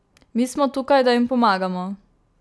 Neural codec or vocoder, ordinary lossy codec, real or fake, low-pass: none; none; real; none